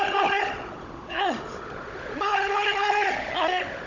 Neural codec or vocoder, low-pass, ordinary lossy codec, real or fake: codec, 16 kHz, 16 kbps, FunCodec, trained on Chinese and English, 50 frames a second; 7.2 kHz; none; fake